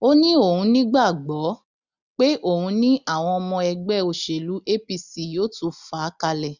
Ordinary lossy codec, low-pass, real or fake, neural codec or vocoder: none; 7.2 kHz; real; none